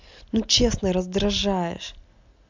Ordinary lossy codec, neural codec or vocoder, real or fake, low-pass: MP3, 64 kbps; none; real; 7.2 kHz